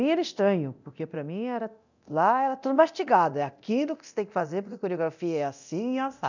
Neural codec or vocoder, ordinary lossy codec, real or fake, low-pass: codec, 24 kHz, 0.9 kbps, DualCodec; none; fake; 7.2 kHz